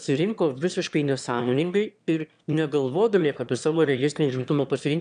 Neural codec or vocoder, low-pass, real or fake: autoencoder, 22.05 kHz, a latent of 192 numbers a frame, VITS, trained on one speaker; 9.9 kHz; fake